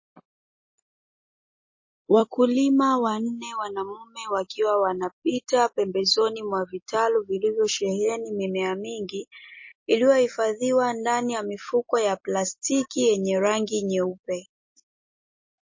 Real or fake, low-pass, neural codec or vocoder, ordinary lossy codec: real; 7.2 kHz; none; MP3, 32 kbps